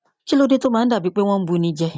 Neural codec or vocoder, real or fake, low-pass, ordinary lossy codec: none; real; none; none